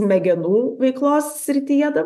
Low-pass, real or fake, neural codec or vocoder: 14.4 kHz; real; none